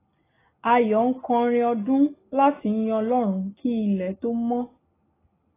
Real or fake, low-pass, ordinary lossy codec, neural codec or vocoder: real; 3.6 kHz; AAC, 16 kbps; none